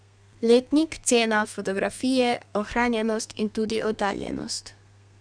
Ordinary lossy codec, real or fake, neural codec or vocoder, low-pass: none; fake; codec, 32 kHz, 1.9 kbps, SNAC; 9.9 kHz